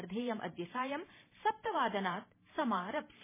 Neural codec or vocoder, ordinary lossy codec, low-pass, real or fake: vocoder, 44.1 kHz, 128 mel bands every 512 samples, BigVGAN v2; MP3, 16 kbps; 3.6 kHz; fake